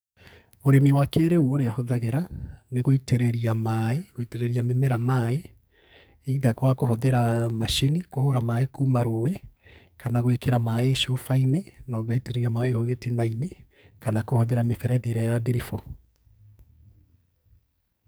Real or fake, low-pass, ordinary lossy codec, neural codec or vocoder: fake; none; none; codec, 44.1 kHz, 2.6 kbps, SNAC